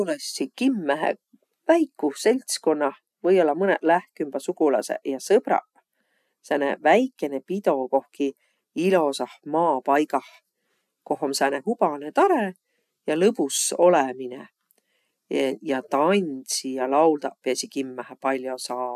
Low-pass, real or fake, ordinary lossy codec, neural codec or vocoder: 14.4 kHz; real; none; none